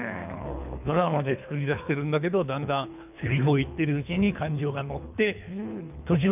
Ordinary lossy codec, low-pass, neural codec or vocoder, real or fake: none; 3.6 kHz; codec, 24 kHz, 3 kbps, HILCodec; fake